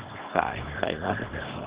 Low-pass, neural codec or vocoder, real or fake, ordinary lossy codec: 3.6 kHz; codec, 24 kHz, 0.9 kbps, WavTokenizer, small release; fake; Opus, 16 kbps